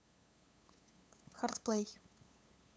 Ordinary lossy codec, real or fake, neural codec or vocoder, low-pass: none; fake; codec, 16 kHz, 8 kbps, FunCodec, trained on LibriTTS, 25 frames a second; none